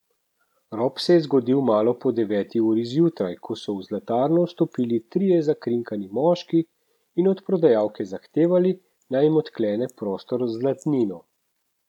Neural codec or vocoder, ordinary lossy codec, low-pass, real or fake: none; none; 19.8 kHz; real